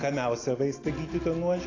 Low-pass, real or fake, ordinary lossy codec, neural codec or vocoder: 7.2 kHz; real; AAC, 32 kbps; none